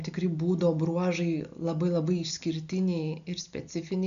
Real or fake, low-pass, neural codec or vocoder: real; 7.2 kHz; none